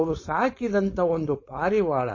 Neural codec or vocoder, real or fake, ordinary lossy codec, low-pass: codec, 16 kHz, 4.8 kbps, FACodec; fake; MP3, 32 kbps; 7.2 kHz